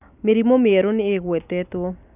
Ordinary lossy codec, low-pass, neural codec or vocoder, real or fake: none; 3.6 kHz; none; real